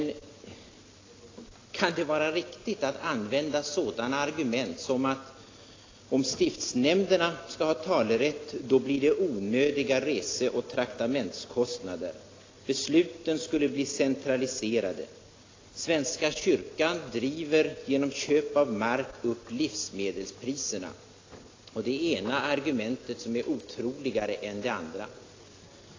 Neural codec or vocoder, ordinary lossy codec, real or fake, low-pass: none; AAC, 32 kbps; real; 7.2 kHz